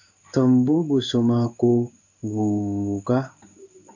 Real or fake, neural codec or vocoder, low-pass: fake; codec, 16 kHz in and 24 kHz out, 1 kbps, XY-Tokenizer; 7.2 kHz